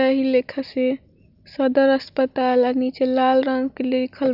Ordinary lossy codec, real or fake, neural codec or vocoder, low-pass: none; real; none; 5.4 kHz